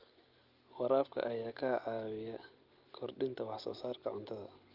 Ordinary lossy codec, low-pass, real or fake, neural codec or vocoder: Opus, 32 kbps; 5.4 kHz; real; none